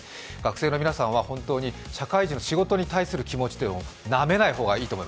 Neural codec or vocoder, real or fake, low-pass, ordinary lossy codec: none; real; none; none